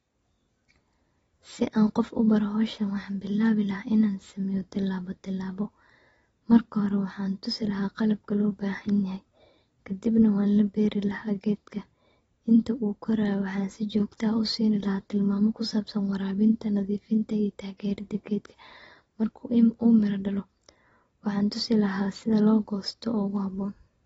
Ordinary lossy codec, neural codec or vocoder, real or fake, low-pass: AAC, 24 kbps; none; real; 19.8 kHz